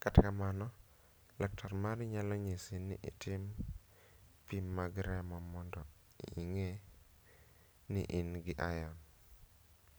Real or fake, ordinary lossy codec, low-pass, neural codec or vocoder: real; none; none; none